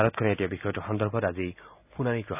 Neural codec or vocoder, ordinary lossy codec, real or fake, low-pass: none; none; real; 3.6 kHz